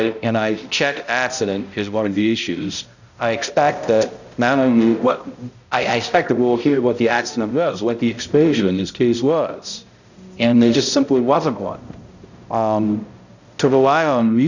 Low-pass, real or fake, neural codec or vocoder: 7.2 kHz; fake; codec, 16 kHz, 0.5 kbps, X-Codec, HuBERT features, trained on balanced general audio